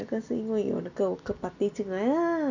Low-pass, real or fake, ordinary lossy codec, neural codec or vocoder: 7.2 kHz; real; none; none